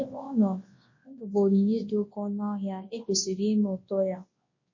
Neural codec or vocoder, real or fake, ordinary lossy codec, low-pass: codec, 24 kHz, 0.9 kbps, WavTokenizer, large speech release; fake; MP3, 32 kbps; 7.2 kHz